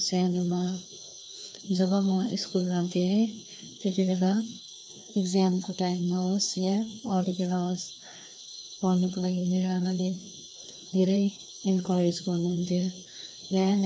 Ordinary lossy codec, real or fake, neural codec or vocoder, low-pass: none; fake; codec, 16 kHz, 2 kbps, FreqCodec, larger model; none